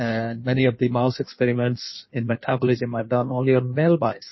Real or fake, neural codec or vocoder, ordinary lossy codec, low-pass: fake; codec, 16 kHz in and 24 kHz out, 1.1 kbps, FireRedTTS-2 codec; MP3, 24 kbps; 7.2 kHz